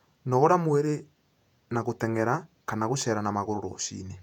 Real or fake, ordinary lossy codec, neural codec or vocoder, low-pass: fake; none; vocoder, 48 kHz, 128 mel bands, Vocos; 19.8 kHz